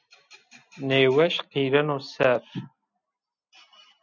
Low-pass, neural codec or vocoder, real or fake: 7.2 kHz; none; real